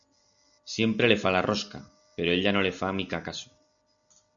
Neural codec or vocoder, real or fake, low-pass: none; real; 7.2 kHz